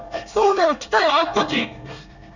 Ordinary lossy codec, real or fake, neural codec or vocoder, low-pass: none; fake; codec, 24 kHz, 1 kbps, SNAC; 7.2 kHz